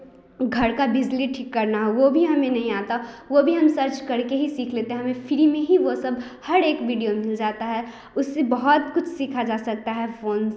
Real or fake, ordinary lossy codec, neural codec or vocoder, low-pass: real; none; none; none